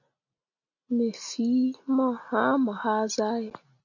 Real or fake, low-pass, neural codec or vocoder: real; 7.2 kHz; none